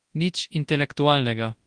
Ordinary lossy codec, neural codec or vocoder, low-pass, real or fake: Opus, 24 kbps; codec, 24 kHz, 0.9 kbps, WavTokenizer, large speech release; 9.9 kHz; fake